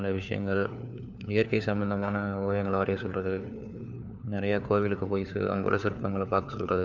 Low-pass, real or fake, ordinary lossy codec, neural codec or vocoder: 7.2 kHz; fake; none; codec, 16 kHz, 4 kbps, FunCodec, trained on LibriTTS, 50 frames a second